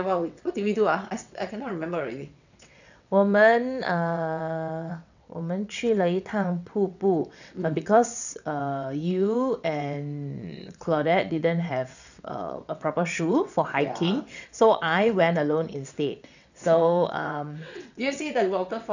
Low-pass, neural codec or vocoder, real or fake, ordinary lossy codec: 7.2 kHz; vocoder, 22.05 kHz, 80 mel bands, WaveNeXt; fake; none